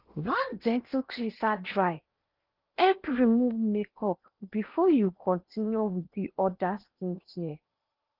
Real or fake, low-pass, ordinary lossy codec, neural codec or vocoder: fake; 5.4 kHz; Opus, 32 kbps; codec, 16 kHz in and 24 kHz out, 0.8 kbps, FocalCodec, streaming, 65536 codes